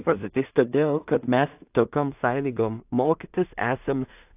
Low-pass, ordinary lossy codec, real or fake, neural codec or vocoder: 3.6 kHz; AAC, 32 kbps; fake; codec, 16 kHz in and 24 kHz out, 0.4 kbps, LongCat-Audio-Codec, two codebook decoder